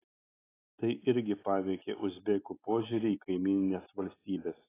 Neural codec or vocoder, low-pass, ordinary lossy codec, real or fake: none; 3.6 kHz; AAC, 16 kbps; real